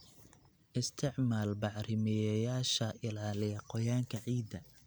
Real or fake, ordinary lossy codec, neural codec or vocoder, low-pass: real; none; none; none